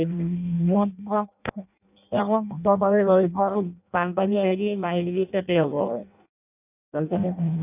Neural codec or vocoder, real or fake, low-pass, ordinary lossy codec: codec, 16 kHz in and 24 kHz out, 0.6 kbps, FireRedTTS-2 codec; fake; 3.6 kHz; none